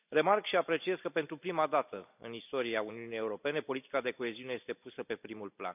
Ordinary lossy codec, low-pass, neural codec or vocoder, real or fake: none; 3.6 kHz; none; real